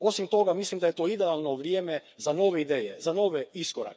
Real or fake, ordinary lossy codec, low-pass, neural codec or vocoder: fake; none; none; codec, 16 kHz, 4 kbps, FreqCodec, smaller model